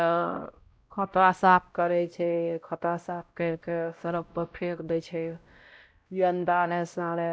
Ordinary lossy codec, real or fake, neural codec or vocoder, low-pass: none; fake; codec, 16 kHz, 0.5 kbps, X-Codec, WavLM features, trained on Multilingual LibriSpeech; none